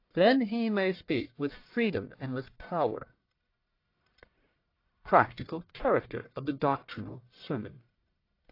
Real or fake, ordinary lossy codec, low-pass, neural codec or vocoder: fake; AAC, 32 kbps; 5.4 kHz; codec, 44.1 kHz, 1.7 kbps, Pupu-Codec